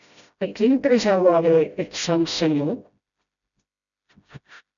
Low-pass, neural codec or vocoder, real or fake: 7.2 kHz; codec, 16 kHz, 0.5 kbps, FreqCodec, smaller model; fake